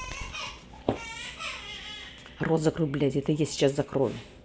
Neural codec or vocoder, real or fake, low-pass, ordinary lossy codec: none; real; none; none